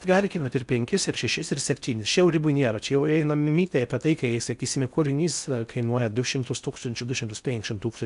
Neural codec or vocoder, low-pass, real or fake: codec, 16 kHz in and 24 kHz out, 0.6 kbps, FocalCodec, streaming, 4096 codes; 10.8 kHz; fake